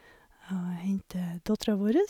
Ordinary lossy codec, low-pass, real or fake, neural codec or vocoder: none; 19.8 kHz; real; none